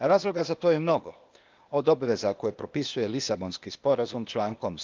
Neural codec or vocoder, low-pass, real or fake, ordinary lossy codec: codec, 16 kHz, 0.8 kbps, ZipCodec; 7.2 kHz; fake; Opus, 24 kbps